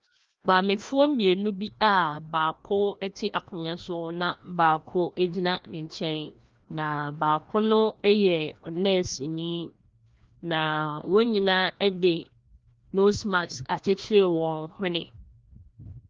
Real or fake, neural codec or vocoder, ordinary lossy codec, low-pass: fake; codec, 16 kHz, 1 kbps, FreqCodec, larger model; Opus, 32 kbps; 7.2 kHz